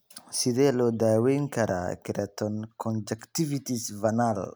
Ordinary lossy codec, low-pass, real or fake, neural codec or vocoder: none; none; real; none